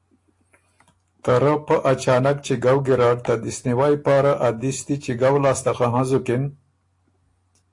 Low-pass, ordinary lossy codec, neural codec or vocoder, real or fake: 10.8 kHz; AAC, 48 kbps; none; real